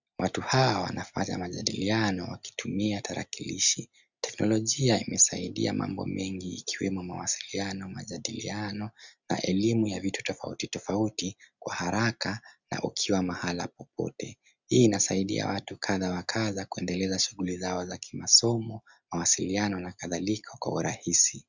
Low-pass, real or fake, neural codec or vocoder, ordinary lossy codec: 7.2 kHz; real; none; Opus, 64 kbps